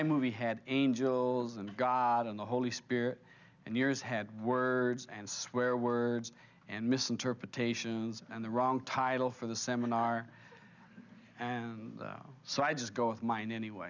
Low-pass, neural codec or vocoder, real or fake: 7.2 kHz; none; real